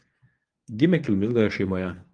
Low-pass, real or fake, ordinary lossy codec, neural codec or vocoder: 9.9 kHz; fake; Opus, 32 kbps; codec, 24 kHz, 0.9 kbps, WavTokenizer, medium speech release version 1